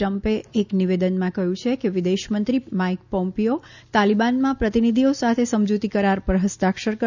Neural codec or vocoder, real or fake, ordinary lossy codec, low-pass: none; real; none; 7.2 kHz